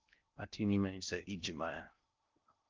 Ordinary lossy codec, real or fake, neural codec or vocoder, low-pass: Opus, 32 kbps; fake; codec, 16 kHz in and 24 kHz out, 0.6 kbps, FocalCodec, streaming, 2048 codes; 7.2 kHz